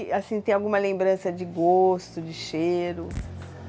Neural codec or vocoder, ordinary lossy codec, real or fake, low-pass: none; none; real; none